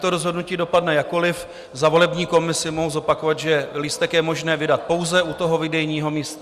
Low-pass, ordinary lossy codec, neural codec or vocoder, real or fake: 14.4 kHz; Opus, 64 kbps; none; real